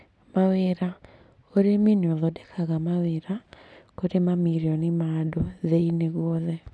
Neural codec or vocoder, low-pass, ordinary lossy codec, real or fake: none; none; none; real